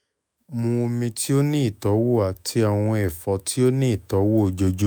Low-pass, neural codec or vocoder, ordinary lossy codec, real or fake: none; autoencoder, 48 kHz, 128 numbers a frame, DAC-VAE, trained on Japanese speech; none; fake